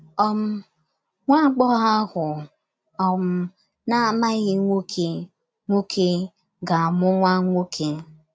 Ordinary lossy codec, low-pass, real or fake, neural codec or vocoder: none; none; real; none